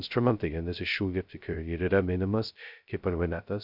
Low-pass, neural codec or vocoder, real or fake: 5.4 kHz; codec, 16 kHz, 0.2 kbps, FocalCodec; fake